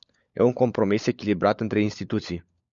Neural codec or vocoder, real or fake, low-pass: codec, 16 kHz, 16 kbps, FunCodec, trained on LibriTTS, 50 frames a second; fake; 7.2 kHz